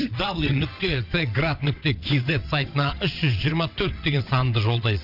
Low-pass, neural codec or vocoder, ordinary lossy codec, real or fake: 5.4 kHz; vocoder, 22.05 kHz, 80 mel bands, WaveNeXt; none; fake